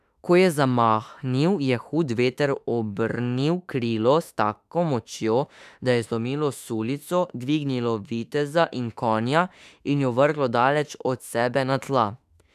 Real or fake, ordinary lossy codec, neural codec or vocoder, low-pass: fake; none; autoencoder, 48 kHz, 32 numbers a frame, DAC-VAE, trained on Japanese speech; 14.4 kHz